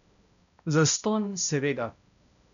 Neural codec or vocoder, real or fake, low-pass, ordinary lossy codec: codec, 16 kHz, 0.5 kbps, X-Codec, HuBERT features, trained on balanced general audio; fake; 7.2 kHz; none